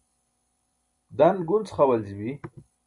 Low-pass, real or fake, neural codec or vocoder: 10.8 kHz; fake; vocoder, 44.1 kHz, 128 mel bands every 256 samples, BigVGAN v2